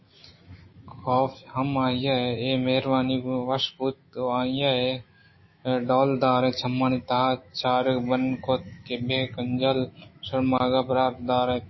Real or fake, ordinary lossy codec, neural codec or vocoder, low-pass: fake; MP3, 24 kbps; autoencoder, 48 kHz, 128 numbers a frame, DAC-VAE, trained on Japanese speech; 7.2 kHz